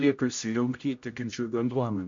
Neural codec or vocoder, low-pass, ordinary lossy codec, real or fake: codec, 16 kHz, 0.5 kbps, X-Codec, HuBERT features, trained on general audio; 7.2 kHz; MP3, 48 kbps; fake